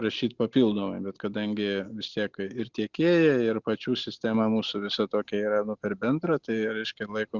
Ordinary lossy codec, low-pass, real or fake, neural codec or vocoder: Opus, 64 kbps; 7.2 kHz; real; none